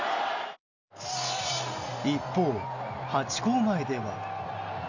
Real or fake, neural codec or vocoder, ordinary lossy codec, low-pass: real; none; none; 7.2 kHz